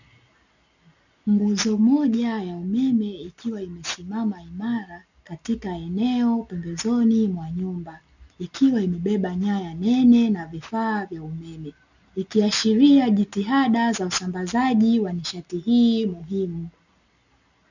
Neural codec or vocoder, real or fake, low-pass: none; real; 7.2 kHz